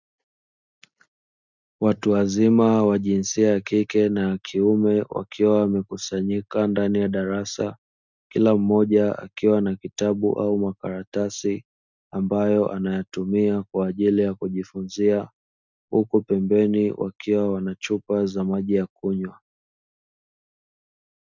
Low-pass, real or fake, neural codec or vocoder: 7.2 kHz; real; none